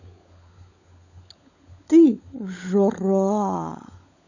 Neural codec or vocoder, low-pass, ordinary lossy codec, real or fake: codec, 16 kHz, 16 kbps, FunCodec, trained on LibriTTS, 50 frames a second; 7.2 kHz; none; fake